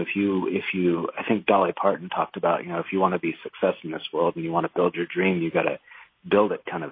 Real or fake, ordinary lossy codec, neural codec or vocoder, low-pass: real; MP3, 24 kbps; none; 5.4 kHz